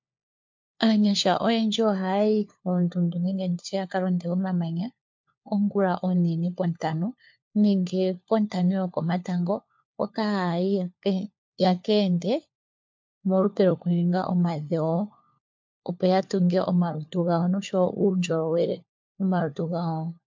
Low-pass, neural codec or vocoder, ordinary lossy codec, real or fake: 7.2 kHz; codec, 16 kHz, 4 kbps, FunCodec, trained on LibriTTS, 50 frames a second; MP3, 48 kbps; fake